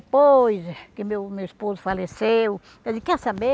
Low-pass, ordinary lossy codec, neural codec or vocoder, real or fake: none; none; none; real